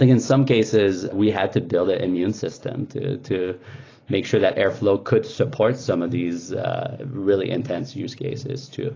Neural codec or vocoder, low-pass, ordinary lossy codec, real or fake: none; 7.2 kHz; AAC, 32 kbps; real